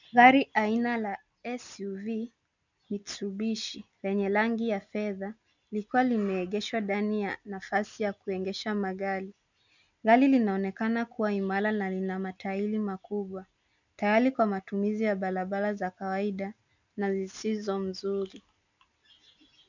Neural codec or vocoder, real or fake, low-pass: none; real; 7.2 kHz